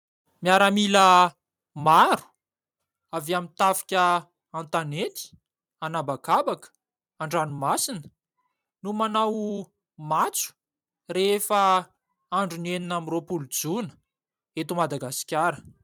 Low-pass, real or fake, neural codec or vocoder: 19.8 kHz; fake; vocoder, 44.1 kHz, 128 mel bands every 256 samples, BigVGAN v2